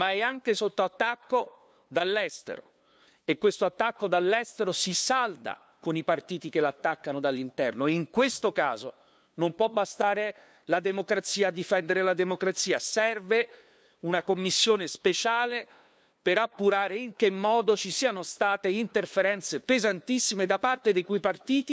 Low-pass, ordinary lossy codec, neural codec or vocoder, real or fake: none; none; codec, 16 kHz, 2 kbps, FunCodec, trained on LibriTTS, 25 frames a second; fake